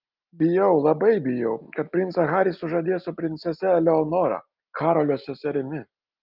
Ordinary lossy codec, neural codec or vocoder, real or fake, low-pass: Opus, 32 kbps; none; real; 5.4 kHz